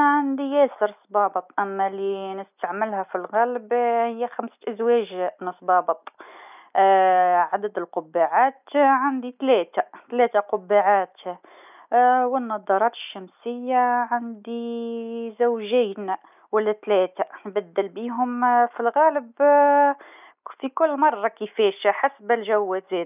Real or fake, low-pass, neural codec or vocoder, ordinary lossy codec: real; 3.6 kHz; none; none